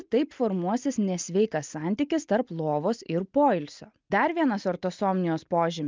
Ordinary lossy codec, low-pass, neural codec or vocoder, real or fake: Opus, 24 kbps; 7.2 kHz; none; real